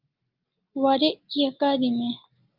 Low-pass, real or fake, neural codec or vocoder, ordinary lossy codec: 5.4 kHz; real; none; Opus, 24 kbps